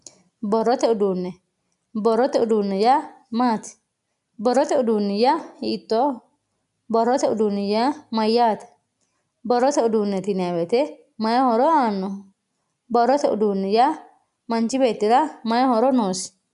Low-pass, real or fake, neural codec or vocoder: 10.8 kHz; real; none